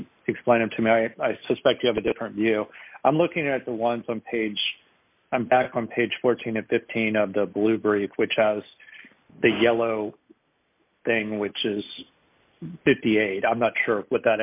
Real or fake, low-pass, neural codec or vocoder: real; 3.6 kHz; none